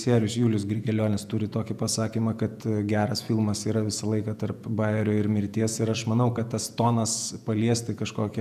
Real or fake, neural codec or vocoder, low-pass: fake; vocoder, 48 kHz, 128 mel bands, Vocos; 14.4 kHz